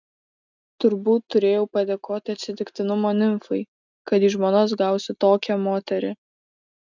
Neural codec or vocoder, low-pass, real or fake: none; 7.2 kHz; real